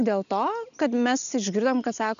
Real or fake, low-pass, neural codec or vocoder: real; 7.2 kHz; none